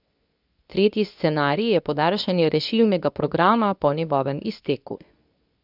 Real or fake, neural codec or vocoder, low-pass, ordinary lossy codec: fake; codec, 24 kHz, 0.9 kbps, WavTokenizer, medium speech release version 2; 5.4 kHz; none